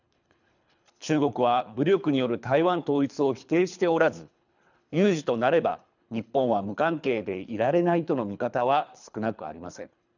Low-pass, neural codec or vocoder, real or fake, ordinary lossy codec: 7.2 kHz; codec, 24 kHz, 3 kbps, HILCodec; fake; none